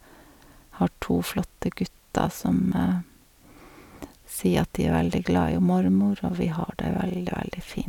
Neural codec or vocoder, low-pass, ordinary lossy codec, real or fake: none; 19.8 kHz; none; real